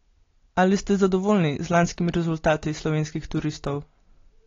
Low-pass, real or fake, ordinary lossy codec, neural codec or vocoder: 7.2 kHz; real; AAC, 32 kbps; none